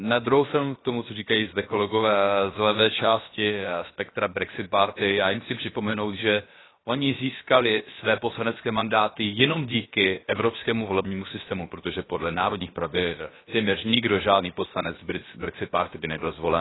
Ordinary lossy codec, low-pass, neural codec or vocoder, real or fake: AAC, 16 kbps; 7.2 kHz; codec, 16 kHz, about 1 kbps, DyCAST, with the encoder's durations; fake